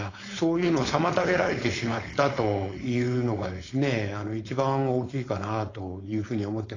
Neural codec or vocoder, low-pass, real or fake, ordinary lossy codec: codec, 16 kHz, 4.8 kbps, FACodec; 7.2 kHz; fake; AAC, 32 kbps